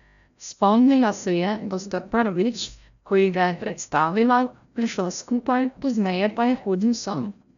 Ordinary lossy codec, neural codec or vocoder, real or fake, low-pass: none; codec, 16 kHz, 0.5 kbps, FreqCodec, larger model; fake; 7.2 kHz